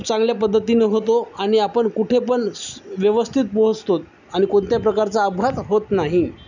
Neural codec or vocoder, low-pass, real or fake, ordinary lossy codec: none; 7.2 kHz; real; none